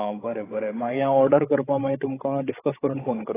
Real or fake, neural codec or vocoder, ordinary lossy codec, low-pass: fake; codec, 16 kHz, 16 kbps, FreqCodec, larger model; AAC, 16 kbps; 3.6 kHz